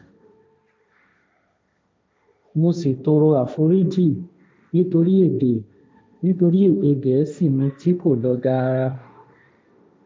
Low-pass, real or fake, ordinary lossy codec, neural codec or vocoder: none; fake; none; codec, 16 kHz, 1.1 kbps, Voila-Tokenizer